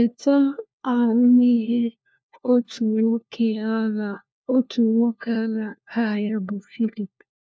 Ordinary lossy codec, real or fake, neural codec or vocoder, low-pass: none; fake; codec, 16 kHz, 1 kbps, FunCodec, trained on LibriTTS, 50 frames a second; none